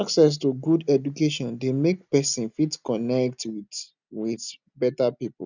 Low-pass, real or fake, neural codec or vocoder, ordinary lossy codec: 7.2 kHz; real; none; none